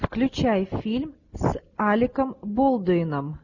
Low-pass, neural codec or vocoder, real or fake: 7.2 kHz; none; real